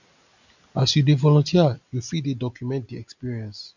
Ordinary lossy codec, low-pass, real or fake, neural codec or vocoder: none; 7.2 kHz; real; none